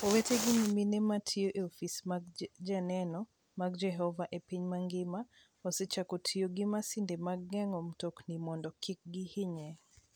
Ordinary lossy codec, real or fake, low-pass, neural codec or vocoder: none; real; none; none